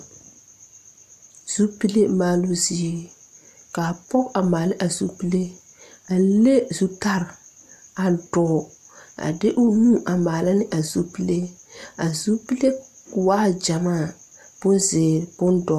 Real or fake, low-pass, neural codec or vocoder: real; 14.4 kHz; none